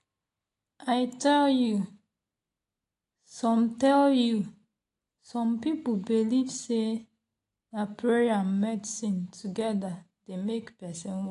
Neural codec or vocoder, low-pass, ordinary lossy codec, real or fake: none; 10.8 kHz; AAC, 48 kbps; real